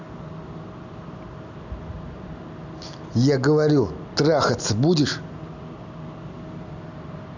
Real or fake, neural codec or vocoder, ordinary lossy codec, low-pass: real; none; none; 7.2 kHz